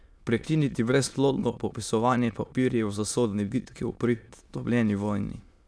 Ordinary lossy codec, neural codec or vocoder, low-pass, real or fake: none; autoencoder, 22.05 kHz, a latent of 192 numbers a frame, VITS, trained on many speakers; none; fake